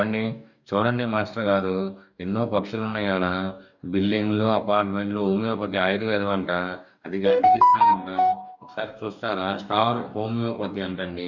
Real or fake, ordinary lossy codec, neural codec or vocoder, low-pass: fake; none; codec, 44.1 kHz, 2.6 kbps, DAC; 7.2 kHz